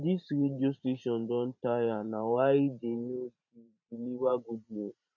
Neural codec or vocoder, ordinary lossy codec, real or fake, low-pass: vocoder, 44.1 kHz, 128 mel bands every 512 samples, BigVGAN v2; none; fake; 7.2 kHz